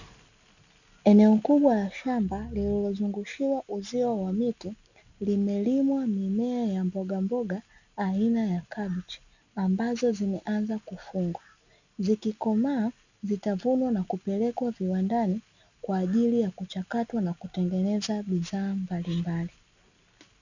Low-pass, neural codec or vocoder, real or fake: 7.2 kHz; none; real